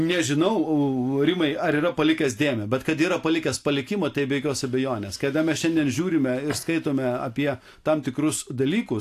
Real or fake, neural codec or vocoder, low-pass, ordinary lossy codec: real; none; 14.4 kHz; AAC, 64 kbps